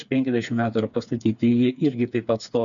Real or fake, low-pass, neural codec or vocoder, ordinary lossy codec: fake; 7.2 kHz; codec, 16 kHz, 4 kbps, FreqCodec, smaller model; MP3, 96 kbps